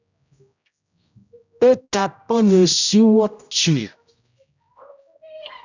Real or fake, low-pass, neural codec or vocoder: fake; 7.2 kHz; codec, 16 kHz, 0.5 kbps, X-Codec, HuBERT features, trained on general audio